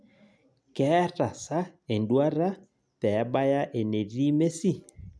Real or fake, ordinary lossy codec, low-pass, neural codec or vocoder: real; none; none; none